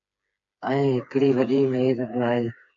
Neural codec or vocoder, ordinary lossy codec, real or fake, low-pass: codec, 16 kHz, 4 kbps, FreqCodec, smaller model; AAC, 64 kbps; fake; 7.2 kHz